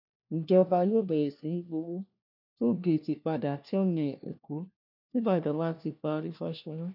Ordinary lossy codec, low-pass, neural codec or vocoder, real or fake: none; 5.4 kHz; codec, 16 kHz, 1 kbps, FunCodec, trained on LibriTTS, 50 frames a second; fake